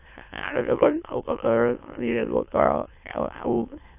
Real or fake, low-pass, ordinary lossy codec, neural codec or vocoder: fake; 3.6 kHz; AAC, 32 kbps; autoencoder, 22.05 kHz, a latent of 192 numbers a frame, VITS, trained on many speakers